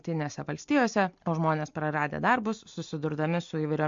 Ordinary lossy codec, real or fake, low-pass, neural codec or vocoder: MP3, 48 kbps; real; 7.2 kHz; none